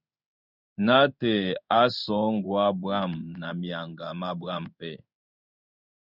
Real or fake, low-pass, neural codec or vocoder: fake; 5.4 kHz; codec, 16 kHz in and 24 kHz out, 1 kbps, XY-Tokenizer